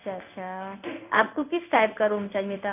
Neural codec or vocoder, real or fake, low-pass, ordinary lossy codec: codec, 16 kHz in and 24 kHz out, 1 kbps, XY-Tokenizer; fake; 3.6 kHz; none